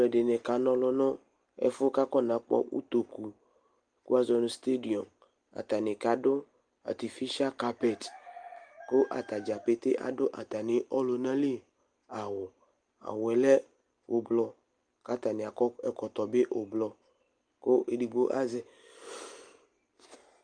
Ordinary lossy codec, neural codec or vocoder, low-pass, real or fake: Opus, 32 kbps; none; 9.9 kHz; real